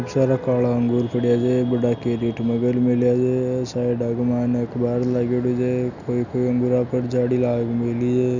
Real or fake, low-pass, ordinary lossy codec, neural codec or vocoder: real; 7.2 kHz; none; none